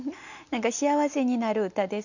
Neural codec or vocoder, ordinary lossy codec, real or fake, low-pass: none; none; real; 7.2 kHz